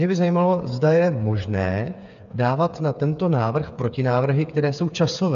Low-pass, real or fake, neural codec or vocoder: 7.2 kHz; fake; codec, 16 kHz, 8 kbps, FreqCodec, smaller model